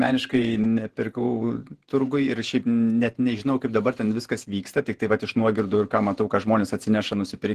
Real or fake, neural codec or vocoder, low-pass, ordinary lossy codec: fake; vocoder, 48 kHz, 128 mel bands, Vocos; 14.4 kHz; Opus, 16 kbps